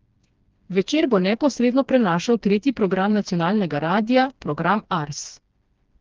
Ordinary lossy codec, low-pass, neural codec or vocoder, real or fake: Opus, 32 kbps; 7.2 kHz; codec, 16 kHz, 2 kbps, FreqCodec, smaller model; fake